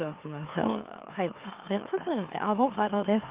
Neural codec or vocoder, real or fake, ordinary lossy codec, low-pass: autoencoder, 44.1 kHz, a latent of 192 numbers a frame, MeloTTS; fake; Opus, 32 kbps; 3.6 kHz